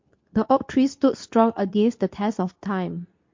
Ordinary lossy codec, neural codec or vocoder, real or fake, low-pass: MP3, 48 kbps; codec, 24 kHz, 0.9 kbps, WavTokenizer, medium speech release version 2; fake; 7.2 kHz